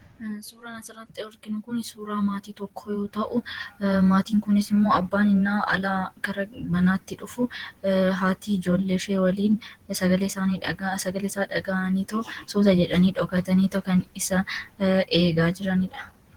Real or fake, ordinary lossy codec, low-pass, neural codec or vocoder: fake; Opus, 16 kbps; 19.8 kHz; vocoder, 48 kHz, 128 mel bands, Vocos